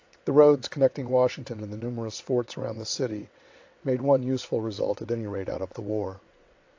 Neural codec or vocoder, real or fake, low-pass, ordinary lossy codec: vocoder, 22.05 kHz, 80 mel bands, WaveNeXt; fake; 7.2 kHz; AAC, 48 kbps